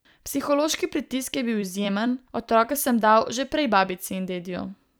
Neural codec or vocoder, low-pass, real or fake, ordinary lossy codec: vocoder, 44.1 kHz, 128 mel bands every 256 samples, BigVGAN v2; none; fake; none